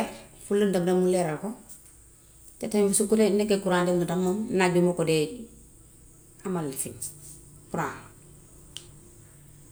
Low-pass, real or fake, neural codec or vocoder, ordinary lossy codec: none; real; none; none